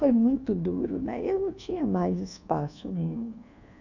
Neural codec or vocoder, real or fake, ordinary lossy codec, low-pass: codec, 24 kHz, 1.2 kbps, DualCodec; fake; none; 7.2 kHz